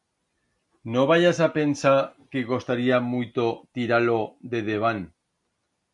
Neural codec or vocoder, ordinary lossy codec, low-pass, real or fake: none; MP3, 64 kbps; 10.8 kHz; real